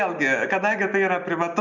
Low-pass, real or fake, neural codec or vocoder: 7.2 kHz; real; none